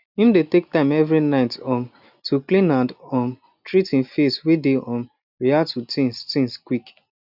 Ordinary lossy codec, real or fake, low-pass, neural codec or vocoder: none; real; 5.4 kHz; none